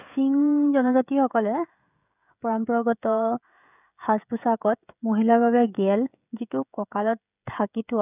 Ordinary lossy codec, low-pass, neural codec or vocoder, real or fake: none; 3.6 kHz; codec, 16 kHz, 16 kbps, FreqCodec, smaller model; fake